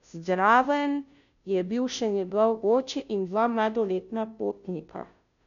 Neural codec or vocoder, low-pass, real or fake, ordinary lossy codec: codec, 16 kHz, 0.5 kbps, FunCodec, trained on Chinese and English, 25 frames a second; 7.2 kHz; fake; none